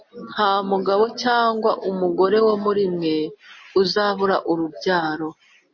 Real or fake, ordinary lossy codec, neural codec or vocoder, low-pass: real; MP3, 32 kbps; none; 7.2 kHz